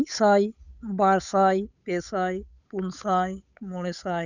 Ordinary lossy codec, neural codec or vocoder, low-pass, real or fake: none; codec, 16 kHz, 8 kbps, FunCodec, trained on Chinese and English, 25 frames a second; 7.2 kHz; fake